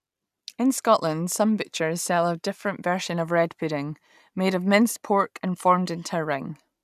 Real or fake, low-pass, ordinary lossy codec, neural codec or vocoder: real; 14.4 kHz; none; none